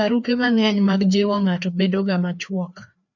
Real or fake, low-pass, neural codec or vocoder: fake; 7.2 kHz; codec, 16 kHz, 2 kbps, FreqCodec, larger model